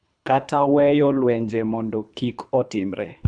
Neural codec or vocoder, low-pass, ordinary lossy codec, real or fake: codec, 24 kHz, 3 kbps, HILCodec; 9.9 kHz; none; fake